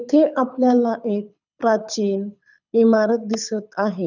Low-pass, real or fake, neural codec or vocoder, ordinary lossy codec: 7.2 kHz; fake; codec, 24 kHz, 6 kbps, HILCodec; none